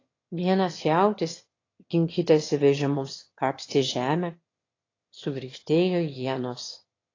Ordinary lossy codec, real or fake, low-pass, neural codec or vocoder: AAC, 32 kbps; fake; 7.2 kHz; autoencoder, 22.05 kHz, a latent of 192 numbers a frame, VITS, trained on one speaker